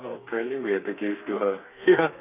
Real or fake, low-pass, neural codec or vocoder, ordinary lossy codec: fake; 3.6 kHz; codec, 32 kHz, 1.9 kbps, SNAC; none